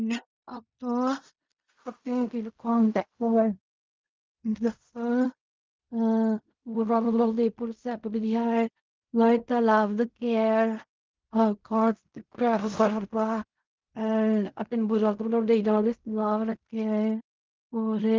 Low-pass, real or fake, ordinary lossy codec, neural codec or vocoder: 7.2 kHz; fake; Opus, 32 kbps; codec, 16 kHz in and 24 kHz out, 0.4 kbps, LongCat-Audio-Codec, fine tuned four codebook decoder